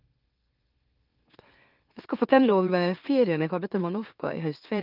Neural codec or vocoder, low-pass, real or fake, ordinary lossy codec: autoencoder, 44.1 kHz, a latent of 192 numbers a frame, MeloTTS; 5.4 kHz; fake; Opus, 24 kbps